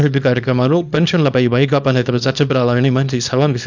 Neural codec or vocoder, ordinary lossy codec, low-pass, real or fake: codec, 24 kHz, 0.9 kbps, WavTokenizer, small release; none; 7.2 kHz; fake